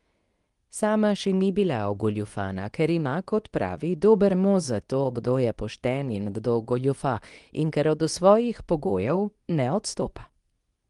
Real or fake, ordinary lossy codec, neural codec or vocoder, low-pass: fake; Opus, 24 kbps; codec, 24 kHz, 0.9 kbps, WavTokenizer, medium speech release version 2; 10.8 kHz